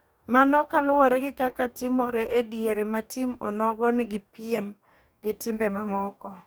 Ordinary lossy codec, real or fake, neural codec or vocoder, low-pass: none; fake; codec, 44.1 kHz, 2.6 kbps, DAC; none